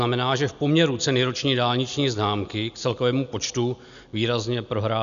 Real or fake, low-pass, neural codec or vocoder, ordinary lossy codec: real; 7.2 kHz; none; MP3, 96 kbps